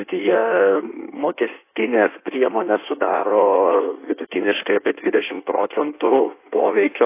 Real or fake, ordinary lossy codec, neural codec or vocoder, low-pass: fake; AAC, 24 kbps; codec, 16 kHz in and 24 kHz out, 1.1 kbps, FireRedTTS-2 codec; 3.6 kHz